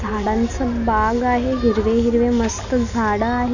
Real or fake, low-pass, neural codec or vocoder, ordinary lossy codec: real; 7.2 kHz; none; none